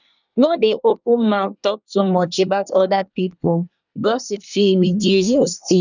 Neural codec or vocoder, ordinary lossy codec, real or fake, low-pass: codec, 24 kHz, 1 kbps, SNAC; none; fake; 7.2 kHz